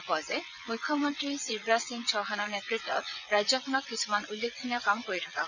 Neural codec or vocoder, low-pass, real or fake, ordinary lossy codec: vocoder, 22.05 kHz, 80 mel bands, WaveNeXt; 7.2 kHz; fake; none